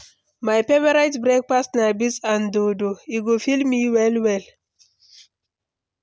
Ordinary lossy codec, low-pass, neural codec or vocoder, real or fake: none; none; none; real